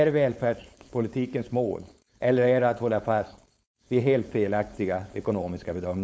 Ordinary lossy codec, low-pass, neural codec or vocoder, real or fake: none; none; codec, 16 kHz, 4.8 kbps, FACodec; fake